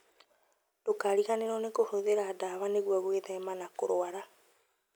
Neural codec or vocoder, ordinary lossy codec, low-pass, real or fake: none; none; none; real